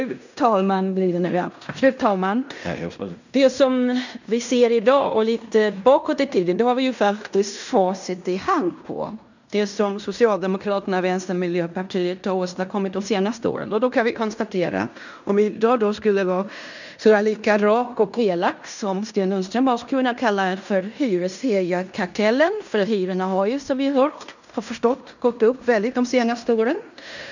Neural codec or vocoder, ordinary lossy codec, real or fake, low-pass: codec, 16 kHz in and 24 kHz out, 0.9 kbps, LongCat-Audio-Codec, fine tuned four codebook decoder; none; fake; 7.2 kHz